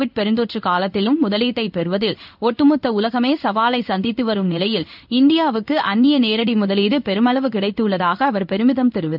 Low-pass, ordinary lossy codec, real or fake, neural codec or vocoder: 5.4 kHz; none; fake; codec, 16 kHz in and 24 kHz out, 1 kbps, XY-Tokenizer